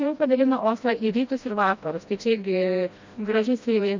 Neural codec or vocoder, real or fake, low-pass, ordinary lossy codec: codec, 16 kHz, 1 kbps, FreqCodec, smaller model; fake; 7.2 kHz; MP3, 64 kbps